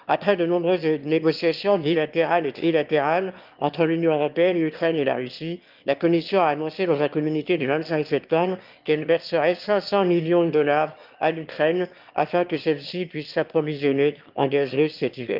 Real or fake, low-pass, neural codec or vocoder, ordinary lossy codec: fake; 5.4 kHz; autoencoder, 22.05 kHz, a latent of 192 numbers a frame, VITS, trained on one speaker; Opus, 24 kbps